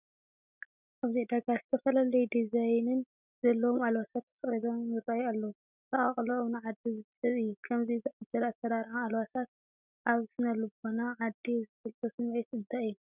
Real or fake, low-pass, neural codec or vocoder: real; 3.6 kHz; none